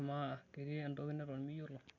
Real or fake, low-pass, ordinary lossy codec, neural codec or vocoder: real; 7.2 kHz; none; none